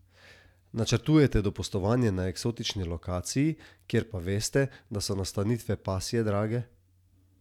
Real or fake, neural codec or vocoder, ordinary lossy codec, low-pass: real; none; none; 19.8 kHz